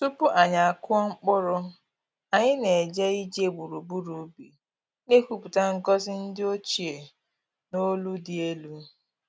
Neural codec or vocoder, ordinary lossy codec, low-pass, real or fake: none; none; none; real